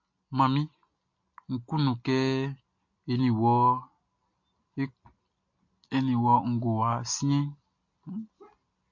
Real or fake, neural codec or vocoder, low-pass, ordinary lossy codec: real; none; 7.2 kHz; MP3, 48 kbps